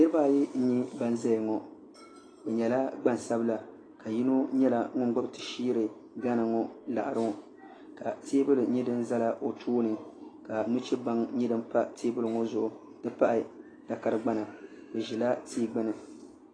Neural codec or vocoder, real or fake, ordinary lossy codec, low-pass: none; real; AAC, 32 kbps; 9.9 kHz